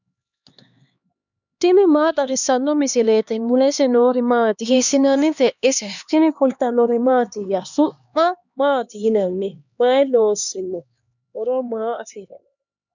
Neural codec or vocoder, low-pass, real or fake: codec, 16 kHz, 2 kbps, X-Codec, HuBERT features, trained on LibriSpeech; 7.2 kHz; fake